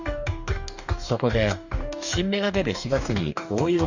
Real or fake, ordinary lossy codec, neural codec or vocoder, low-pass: fake; none; codec, 44.1 kHz, 2.6 kbps, DAC; 7.2 kHz